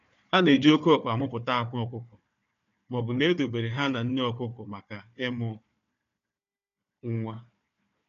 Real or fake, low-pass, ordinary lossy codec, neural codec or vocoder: fake; 7.2 kHz; none; codec, 16 kHz, 4 kbps, FunCodec, trained on Chinese and English, 50 frames a second